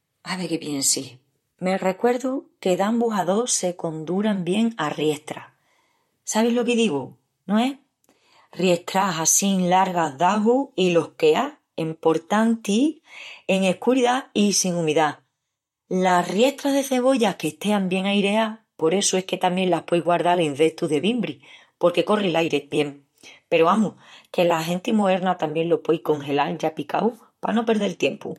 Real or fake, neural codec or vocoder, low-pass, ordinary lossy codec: fake; vocoder, 44.1 kHz, 128 mel bands, Pupu-Vocoder; 19.8 kHz; MP3, 64 kbps